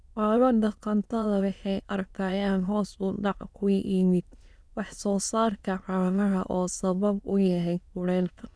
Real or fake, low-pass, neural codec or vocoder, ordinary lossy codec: fake; none; autoencoder, 22.05 kHz, a latent of 192 numbers a frame, VITS, trained on many speakers; none